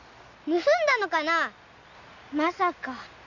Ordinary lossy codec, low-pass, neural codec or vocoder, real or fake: none; 7.2 kHz; none; real